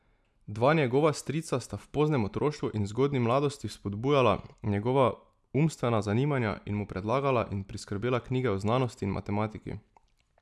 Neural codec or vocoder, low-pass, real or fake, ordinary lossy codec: none; none; real; none